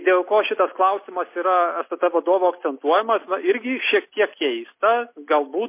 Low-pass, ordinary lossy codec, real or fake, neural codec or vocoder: 3.6 kHz; MP3, 24 kbps; real; none